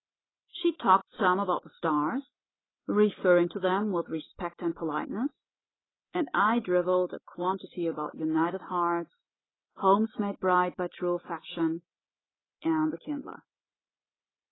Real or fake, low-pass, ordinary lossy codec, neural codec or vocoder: real; 7.2 kHz; AAC, 16 kbps; none